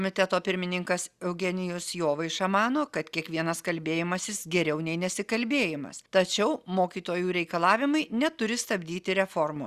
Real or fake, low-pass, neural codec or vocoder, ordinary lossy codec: real; 14.4 kHz; none; Opus, 64 kbps